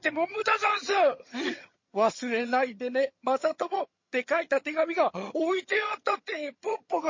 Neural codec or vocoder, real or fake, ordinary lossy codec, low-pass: vocoder, 22.05 kHz, 80 mel bands, HiFi-GAN; fake; MP3, 32 kbps; 7.2 kHz